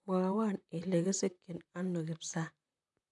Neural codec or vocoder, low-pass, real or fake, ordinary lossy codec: vocoder, 44.1 kHz, 128 mel bands, Pupu-Vocoder; 10.8 kHz; fake; none